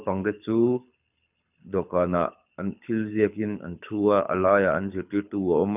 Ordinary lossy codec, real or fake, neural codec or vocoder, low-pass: none; fake; codec, 24 kHz, 6 kbps, HILCodec; 3.6 kHz